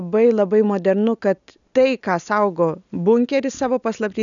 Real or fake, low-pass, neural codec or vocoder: real; 7.2 kHz; none